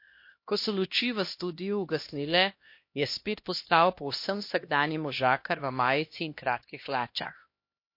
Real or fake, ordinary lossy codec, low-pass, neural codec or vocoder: fake; MP3, 32 kbps; 5.4 kHz; codec, 16 kHz, 1 kbps, X-Codec, HuBERT features, trained on LibriSpeech